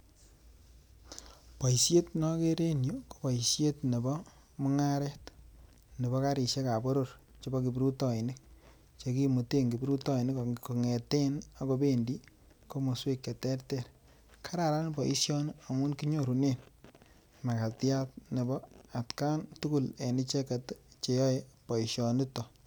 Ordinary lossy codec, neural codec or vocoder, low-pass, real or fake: none; none; none; real